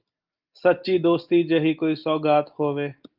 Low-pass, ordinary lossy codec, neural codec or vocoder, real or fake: 5.4 kHz; Opus, 24 kbps; none; real